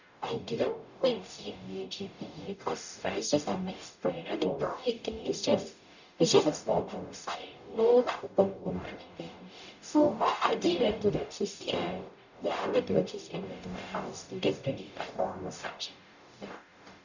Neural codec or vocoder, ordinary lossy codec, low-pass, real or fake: codec, 44.1 kHz, 0.9 kbps, DAC; none; 7.2 kHz; fake